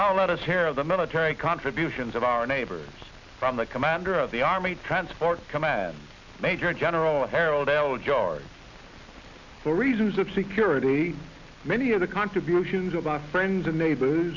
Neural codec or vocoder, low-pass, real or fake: none; 7.2 kHz; real